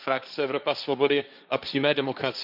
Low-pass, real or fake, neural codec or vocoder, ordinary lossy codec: 5.4 kHz; fake; codec, 16 kHz, 1.1 kbps, Voila-Tokenizer; none